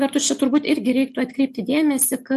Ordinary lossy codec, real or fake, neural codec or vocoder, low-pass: MP3, 64 kbps; real; none; 14.4 kHz